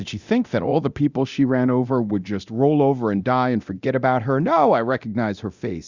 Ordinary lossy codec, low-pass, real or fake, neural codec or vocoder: Opus, 64 kbps; 7.2 kHz; fake; codec, 24 kHz, 0.9 kbps, DualCodec